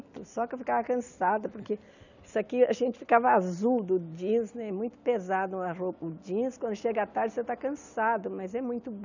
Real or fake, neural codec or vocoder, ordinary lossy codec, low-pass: real; none; none; 7.2 kHz